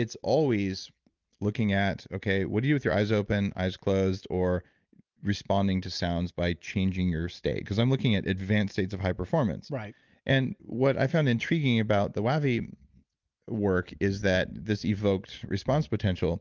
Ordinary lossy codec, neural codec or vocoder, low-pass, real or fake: Opus, 24 kbps; none; 7.2 kHz; real